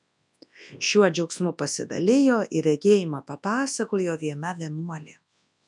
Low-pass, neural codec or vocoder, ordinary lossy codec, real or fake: 10.8 kHz; codec, 24 kHz, 0.9 kbps, WavTokenizer, large speech release; MP3, 96 kbps; fake